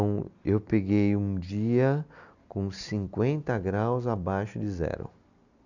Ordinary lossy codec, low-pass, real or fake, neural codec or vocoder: none; 7.2 kHz; real; none